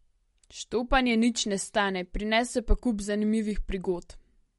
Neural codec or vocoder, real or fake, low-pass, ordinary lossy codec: none; real; 19.8 kHz; MP3, 48 kbps